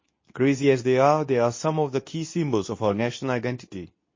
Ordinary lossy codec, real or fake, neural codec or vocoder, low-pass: MP3, 32 kbps; fake; codec, 24 kHz, 0.9 kbps, WavTokenizer, medium speech release version 2; 7.2 kHz